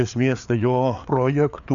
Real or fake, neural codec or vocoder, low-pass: fake; codec, 16 kHz, 4 kbps, FreqCodec, larger model; 7.2 kHz